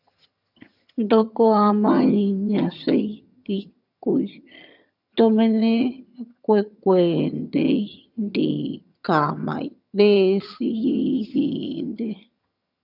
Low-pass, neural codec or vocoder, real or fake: 5.4 kHz; vocoder, 22.05 kHz, 80 mel bands, HiFi-GAN; fake